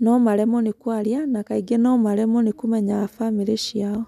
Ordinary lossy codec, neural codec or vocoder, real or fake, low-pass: none; none; real; 14.4 kHz